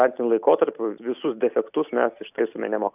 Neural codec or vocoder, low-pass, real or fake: none; 3.6 kHz; real